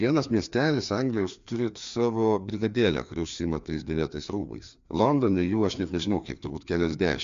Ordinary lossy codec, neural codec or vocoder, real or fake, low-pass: MP3, 64 kbps; codec, 16 kHz, 2 kbps, FreqCodec, larger model; fake; 7.2 kHz